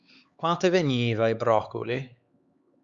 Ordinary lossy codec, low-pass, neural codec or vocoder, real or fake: Opus, 64 kbps; 7.2 kHz; codec, 16 kHz, 4 kbps, X-Codec, HuBERT features, trained on LibriSpeech; fake